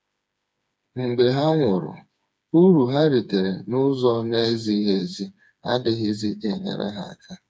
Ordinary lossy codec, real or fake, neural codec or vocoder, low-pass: none; fake; codec, 16 kHz, 4 kbps, FreqCodec, smaller model; none